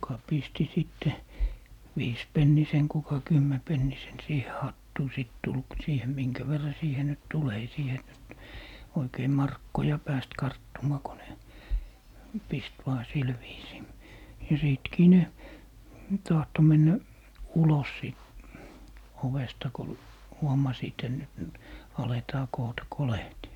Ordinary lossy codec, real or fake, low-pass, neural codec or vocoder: none; real; 19.8 kHz; none